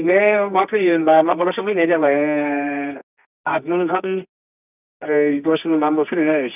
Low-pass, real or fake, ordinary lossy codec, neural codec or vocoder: 3.6 kHz; fake; none; codec, 24 kHz, 0.9 kbps, WavTokenizer, medium music audio release